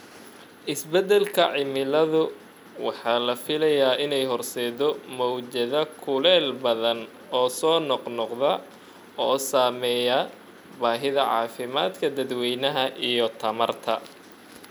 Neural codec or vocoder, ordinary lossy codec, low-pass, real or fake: none; none; 19.8 kHz; real